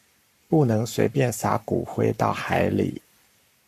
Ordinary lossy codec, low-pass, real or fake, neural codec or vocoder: AAC, 96 kbps; 14.4 kHz; fake; codec, 44.1 kHz, 7.8 kbps, Pupu-Codec